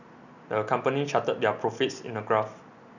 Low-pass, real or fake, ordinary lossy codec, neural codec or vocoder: 7.2 kHz; real; none; none